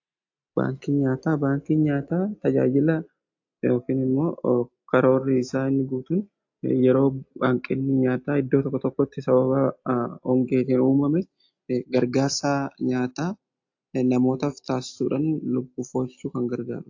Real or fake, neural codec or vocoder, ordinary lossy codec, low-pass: real; none; AAC, 48 kbps; 7.2 kHz